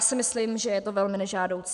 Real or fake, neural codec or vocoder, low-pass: real; none; 10.8 kHz